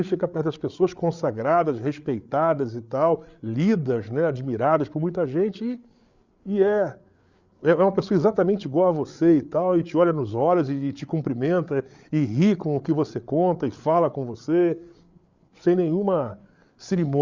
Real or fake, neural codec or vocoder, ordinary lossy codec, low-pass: fake; codec, 16 kHz, 8 kbps, FreqCodec, larger model; Opus, 64 kbps; 7.2 kHz